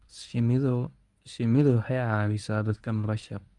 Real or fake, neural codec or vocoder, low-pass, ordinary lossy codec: fake; codec, 24 kHz, 0.9 kbps, WavTokenizer, medium speech release version 1; 10.8 kHz; none